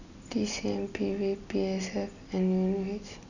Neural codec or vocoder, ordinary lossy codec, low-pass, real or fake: none; none; 7.2 kHz; real